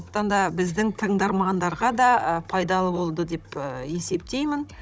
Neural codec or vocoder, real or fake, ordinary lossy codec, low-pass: codec, 16 kHz, 16 kbps, FunCodec, trained on Chinese and English, 50 frames a second; fake; none; none